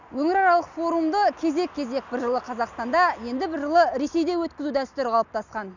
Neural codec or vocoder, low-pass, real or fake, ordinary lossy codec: none; 7.2 kHz; real; none